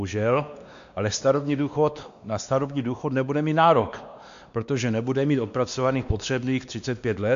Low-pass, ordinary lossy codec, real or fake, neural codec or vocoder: 7.2 kHz; MP3, 64 kbps; fake; codec, 16 kHz, 2 kbps, X-Codec, WavLM features, trained on Multilingual LibriSpeech